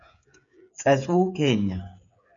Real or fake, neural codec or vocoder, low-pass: fake; codec, 16 kHz, 8 kbps, FreqCodec, smaller model; 7.2 kHz